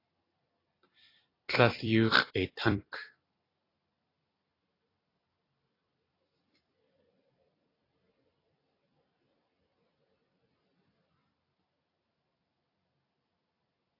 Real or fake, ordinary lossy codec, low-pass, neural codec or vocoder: fake; AAC, 24 kbps; 5.4 kHz; vocoder, 44.1 kHz, 128 mel bands every 256 samples, BigVGAN v2